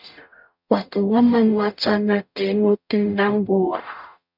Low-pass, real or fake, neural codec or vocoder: 5.4 kHz; fake; codec, 44.1 kHz, 0.9 kbps, DAC